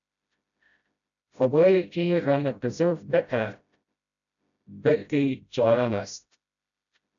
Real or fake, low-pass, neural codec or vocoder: fake; 7.2 kHz; codec, 16 kHz, 0.5 kbps, FreqCodec, smaller model